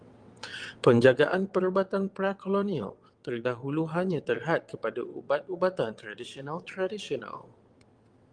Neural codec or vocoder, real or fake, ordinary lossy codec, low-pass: vocoder, 22.05 kHz, 80 mel bands, WaveNeXt; fake; Opus, 24 kbps; 9.9 kHz